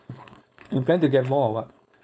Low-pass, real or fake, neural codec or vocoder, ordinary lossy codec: none; fake; codec, 16 kHz, 4.8 kbps, FACodec; none